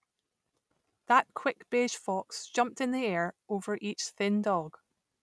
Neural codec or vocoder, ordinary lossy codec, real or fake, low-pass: none; none; real; none